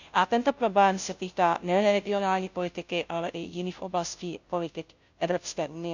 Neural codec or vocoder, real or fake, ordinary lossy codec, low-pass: codec, 16 kHz, 0.5 kbps, FunCodec, trained on Chinese and English, 25 frames a second; fake; none; 7.2 kHz